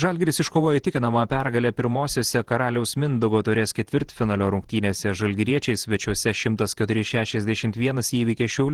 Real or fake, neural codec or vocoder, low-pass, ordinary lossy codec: fake; vocoder, 48 kHz, 128 mel bands, Vocos; 19.8 kHz; Opus, 16 kbps